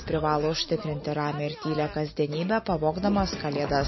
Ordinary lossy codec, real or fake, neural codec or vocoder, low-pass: MP3, 24 kbps; real; none; 7.2 kHz